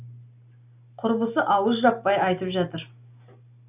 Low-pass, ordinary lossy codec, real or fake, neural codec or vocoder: 3.6 kHz; none; real; none